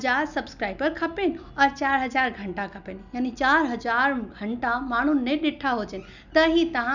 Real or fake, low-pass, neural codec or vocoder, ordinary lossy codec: real; 7.2 kHz; none; none